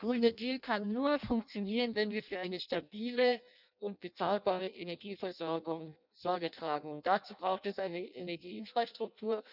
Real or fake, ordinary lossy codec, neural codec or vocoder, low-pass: fake; none; codec, 16 kHz in and 24 kHz out, 0.6 kbps, FireRedTTS-2 codec; 5.4 kHz